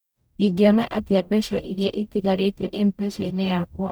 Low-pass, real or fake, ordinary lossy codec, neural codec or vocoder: none; fake; none; codec, 44.1 kHz, 0.9 kbps, DAC